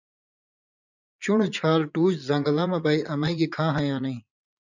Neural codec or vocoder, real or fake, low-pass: vocoder, 22.05 kHz, 80 mel bands, Vocos; fake; 7.2 kHz